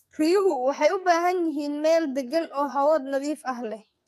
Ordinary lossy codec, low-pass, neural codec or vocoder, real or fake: AAC, 96 kbps; 14.4 kHz; codec, 44.1 kHz, 2.6 kbps, SNAC; fake